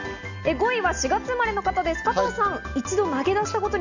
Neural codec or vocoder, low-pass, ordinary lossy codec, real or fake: none; 7.2 kHz; none; real